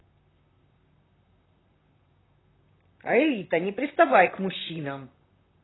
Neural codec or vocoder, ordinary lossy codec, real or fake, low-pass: vocoder, 44.1 kHz, 128 mel bands, Pupu-Vocoder; AAC, 16 kbps; fake; 7.2 kHz